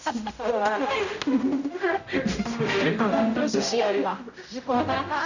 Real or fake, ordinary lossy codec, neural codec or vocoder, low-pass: fake; none; codec, 16 kHz, 0.5 kbps, X-Codec, HuBERT features, trained on general audio; 7.2 kHz